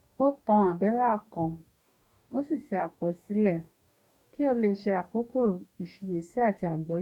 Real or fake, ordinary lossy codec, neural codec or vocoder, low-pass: fake; none; codec, 44.1 kHz, 2.6 kbps, DAC; 19.8 kHz